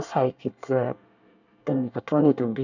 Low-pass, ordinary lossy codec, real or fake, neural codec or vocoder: 7.2 kHz; none; fake; codec, 24 kHz, 1 kbps, SNAC